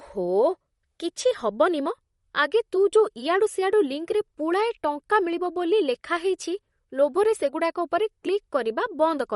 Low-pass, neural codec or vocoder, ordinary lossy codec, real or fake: 19.8 kHz; vocoder, 44.1 kHz, 128 mel bands, Pupu-Vocoder; MP3, 48 kbps; fake